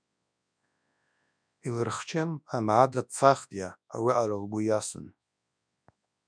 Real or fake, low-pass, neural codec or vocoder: fake; 9.9 kHz; codec, 24 kHz, 0.9 kbps, WavTokenizer, large speech release